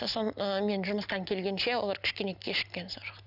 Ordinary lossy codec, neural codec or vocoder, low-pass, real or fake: none; none; 5.4 kHz; real